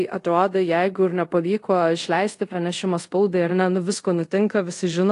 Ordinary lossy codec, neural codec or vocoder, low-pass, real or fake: AAC, 48 kbps; codec, 24 kHz, 0.5 kbps, DualCodec; 10.8 kHz; fake